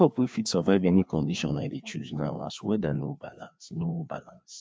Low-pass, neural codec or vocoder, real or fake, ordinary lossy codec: none; codec, 16 kHz, 2 kbps, FreqCodec, larger model; fake; none